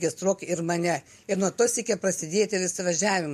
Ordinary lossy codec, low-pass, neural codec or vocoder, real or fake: MP3, 64 kbps; 14.4 kHz; none; real